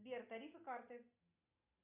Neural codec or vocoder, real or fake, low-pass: none; real; 3.6 kHz